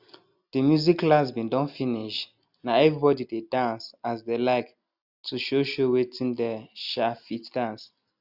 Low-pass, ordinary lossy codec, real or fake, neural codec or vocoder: 5.4 kHz; Opus, 64 kbps; real; none